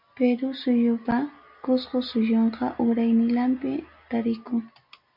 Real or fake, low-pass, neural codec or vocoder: real; 5.4 kHz; none